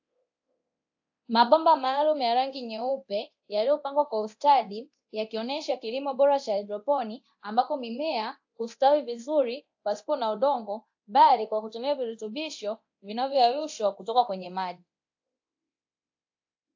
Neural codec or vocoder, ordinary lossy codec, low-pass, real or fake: codec, 24 kHz, 0.9 kbps, DualCodec; AAC, 48 kbps; 7.2 kHz; fake